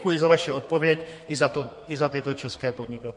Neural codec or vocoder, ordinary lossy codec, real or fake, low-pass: codec, 32 kHz, 1.9 kbps, SNAC; MP3, 48 kbps; fake; 10.8 kHz